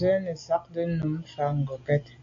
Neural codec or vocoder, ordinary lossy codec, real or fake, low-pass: none; AAC, 48 kbps; real; 7.2 kHz